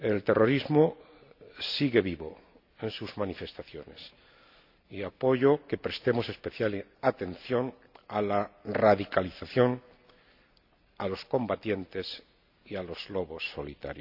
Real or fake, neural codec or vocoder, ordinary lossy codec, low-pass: real; none; none; 5.4 kHz